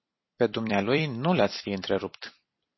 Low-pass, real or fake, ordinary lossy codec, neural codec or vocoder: 7.2 kHz; fake; MP3, 24 kbps; vocoder, 44.1 kHz, 128 mel bands every 256 samples, BigVGAN v2